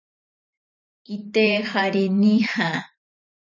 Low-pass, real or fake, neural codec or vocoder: 7.2 kHz; fake; vocoder, 44.1 kHz, 128 mel bands every 512 samples, BigVGAN v2